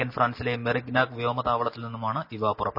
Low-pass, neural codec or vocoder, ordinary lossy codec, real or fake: 5.4 kHz; none; none; real